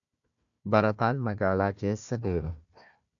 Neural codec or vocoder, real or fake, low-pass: codec, 16 kHz, 1 kbps, FunCodec, trained on Chinese and English, 50 frames a second; fake; 7.2 kHz